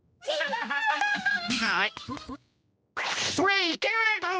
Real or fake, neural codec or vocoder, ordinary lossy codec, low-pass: fake; codec, 16 kHz, 1 kbps, X-Codec, HuBERT features, trained on general audio; none; none